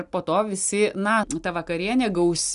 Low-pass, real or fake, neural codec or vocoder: 10.8 kHz; real; none